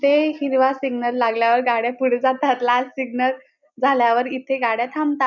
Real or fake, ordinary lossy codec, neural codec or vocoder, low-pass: real; none; none; 7.2 kHz